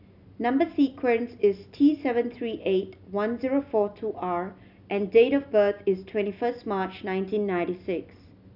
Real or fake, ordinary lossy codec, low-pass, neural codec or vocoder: real; none; 5.4 kHz; none